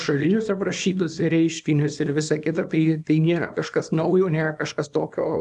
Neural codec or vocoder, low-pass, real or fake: codec, 24 kHz, 0.9 kbps, WavTokenizer, small release; 10.8 kHz; fake